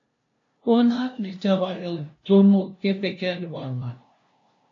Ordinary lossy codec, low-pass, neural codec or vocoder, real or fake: AAC, 48 kbps; 7.2 kHz; codec, 16 kHz, 0.5 kbps, FunCodec, trained on LibriTTS, 25 frames a second; fake